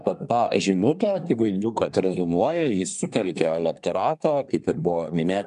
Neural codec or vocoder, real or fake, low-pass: codec, 24 kHz, 1 kbps, SNAC; fake; 10.8 kHz